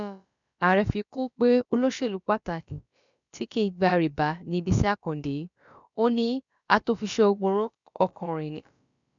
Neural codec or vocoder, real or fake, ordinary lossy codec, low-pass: codec, 16 kHz, about 1 kbps, DyCAST, with the encoder's durations; fake; MP3, 96 kbps; 7.2 kHz